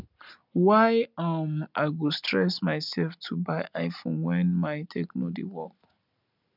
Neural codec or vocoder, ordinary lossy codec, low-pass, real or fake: none; none; 5.4 kHz; real